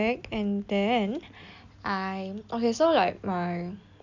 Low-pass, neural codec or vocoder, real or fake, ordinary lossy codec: 7.2 kHz; none; real; none